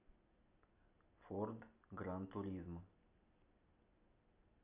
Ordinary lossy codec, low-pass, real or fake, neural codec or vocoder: none; 3.6 kHz; real; none